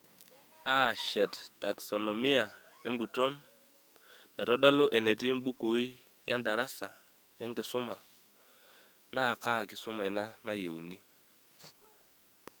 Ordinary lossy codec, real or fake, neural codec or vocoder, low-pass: none; fake; codec, 44.1 kHz, 2.6 kbps, SNAC; none